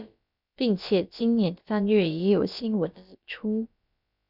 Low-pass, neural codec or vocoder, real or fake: 5.4 kHz; codec, 16 kHz, about 1 kbps, DyCAST, with the encoder's durations; fake